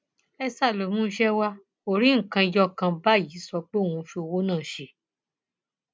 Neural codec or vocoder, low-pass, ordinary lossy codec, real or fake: none; none; none; real